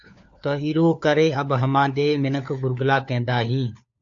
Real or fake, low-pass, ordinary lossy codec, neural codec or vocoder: fake; 7.2 kHz; AAC, 64 kbps; codec, 16 kHz, 4 kbps, FunCodec, trained on LibriTTS, 50 frames a second